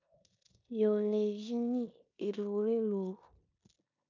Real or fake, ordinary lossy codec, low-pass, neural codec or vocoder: fake; MP3, 64 kbps; 7.2 kHz; codec, 16 kHz in and 24 kHz out, 0.9 kbps, LongCat-Audio-Codec, four codebook decoder